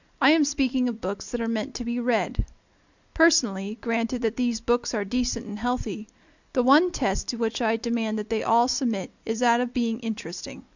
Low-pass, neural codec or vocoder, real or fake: 7.2 kHz; none; real